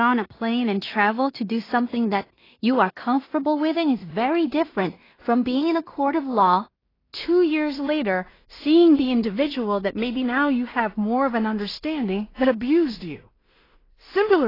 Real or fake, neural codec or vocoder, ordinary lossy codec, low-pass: fake; codec, 16 kHz in and 24 kHz out, 0.4 kbps, LongCat-Audio-Codec, two codebook decoder; AAC, 24 kbps; 5.4 kHz